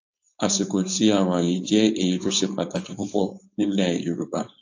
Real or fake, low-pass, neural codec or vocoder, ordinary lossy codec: fake; 7.2 kHz; codec, 16 kHz, 4.8 kbps, FACodec; AAC, 48 kbps